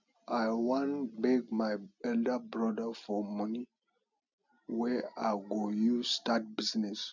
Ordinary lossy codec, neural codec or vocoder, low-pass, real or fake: none; none; 7.2 kHz; real